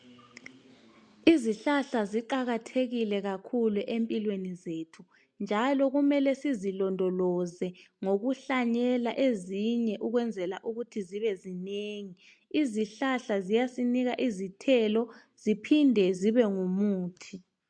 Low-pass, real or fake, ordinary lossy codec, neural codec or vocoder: 9.9 kHz; real; MP3, 64 kbps; none